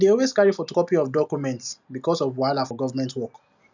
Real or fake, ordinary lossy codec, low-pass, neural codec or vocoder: real; none; 7.2 kHz; none